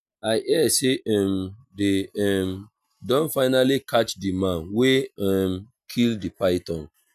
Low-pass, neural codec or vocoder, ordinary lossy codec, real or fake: 14.4 kHz; none; none; real